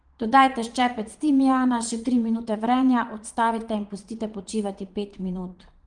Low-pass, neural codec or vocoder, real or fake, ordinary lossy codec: 9.9 kHz; vocoder, 22.05 kHz, 80 mel bands, Vocos; fake; Opus, 24 kbps